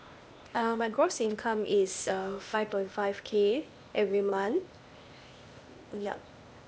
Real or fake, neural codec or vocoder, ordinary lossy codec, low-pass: fake; codec, 16 kHz, 0.8 kbps, ZipCodec; none; none